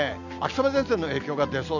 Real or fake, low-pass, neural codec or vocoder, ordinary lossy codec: real; 7.2 kHz; none; none